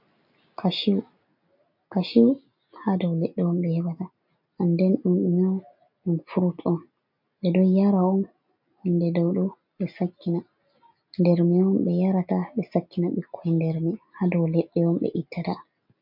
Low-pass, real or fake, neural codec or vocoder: 5.4 kHz; real; none